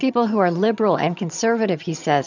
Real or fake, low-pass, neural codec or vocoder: fake; 7.2 kHz; vocoder, 22.05 kHz, 80 mel bands, HiFi-GAN